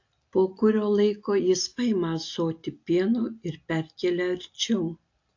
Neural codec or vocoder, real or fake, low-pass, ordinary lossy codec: none; real; 7.2 kHz; AAC, 48 kbps